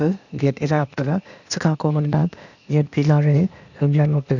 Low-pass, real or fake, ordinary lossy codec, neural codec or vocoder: 7.2 kHz; fake; none; codec, 16 kHz, 0.8 kbps, ZipCodec